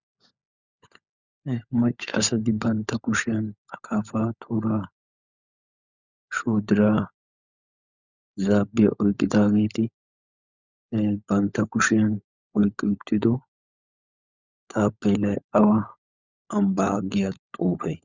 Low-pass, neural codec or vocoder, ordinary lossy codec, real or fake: 7.2 kHz; codec, 16 kHz, 16 kbps, FunCodec, trained on LibriTTS, 50 frames a second; Opus, 64 kbps; fake